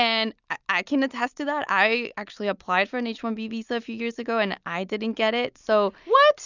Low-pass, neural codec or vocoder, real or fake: 7.2 kHz; none; real